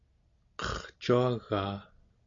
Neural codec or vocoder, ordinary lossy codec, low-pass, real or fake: none; MP3, 64 kbps; 7.2 kHz; real